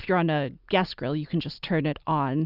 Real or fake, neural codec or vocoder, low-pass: real; none; 5.4 kHz